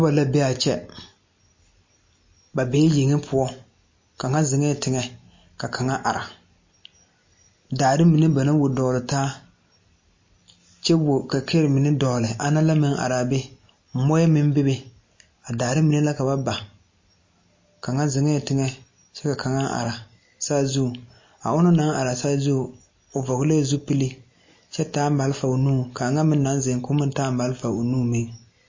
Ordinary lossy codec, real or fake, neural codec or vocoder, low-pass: MP3, 32 kbps; real; none; 7.2 kHz